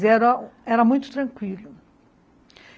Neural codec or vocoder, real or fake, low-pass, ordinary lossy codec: none; real; none; none